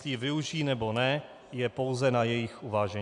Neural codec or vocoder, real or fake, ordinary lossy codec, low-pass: none; real; MP3, 96 kbps; 10.8 kHz